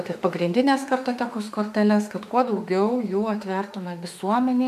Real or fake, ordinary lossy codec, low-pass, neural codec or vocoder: fake; MP3, 96 kbps; 14.4 kHz; autoencoder, 48 kHz, 32 numbers a frame, DAC-VAE, trained on Japanese speech